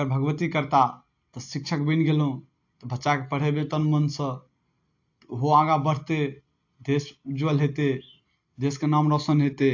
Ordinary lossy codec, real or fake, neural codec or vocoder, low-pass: AAC, 48 kbps; real; none; 7.2 kHz